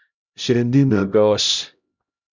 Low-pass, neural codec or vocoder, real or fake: 7.2 kHz; codec, 16 kHz, 0.5 kbps, X-Codec, HuBERT features, trained on LibriSpeech; fake